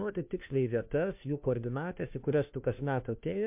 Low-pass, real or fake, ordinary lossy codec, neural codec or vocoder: 3.6 kHz; fake; MP3, 32 kbps; codec, 16 kHz, 1 kbps, FunCodec, trained on LibriTTS, 50 frames a second